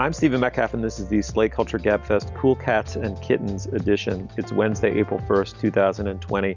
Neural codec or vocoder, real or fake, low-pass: none; real; 7.2 kHz